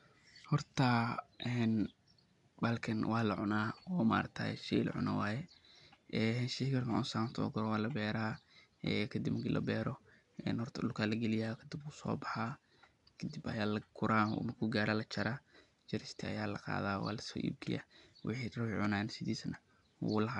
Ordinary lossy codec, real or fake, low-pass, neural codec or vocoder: none; real; 10.8 kHz; none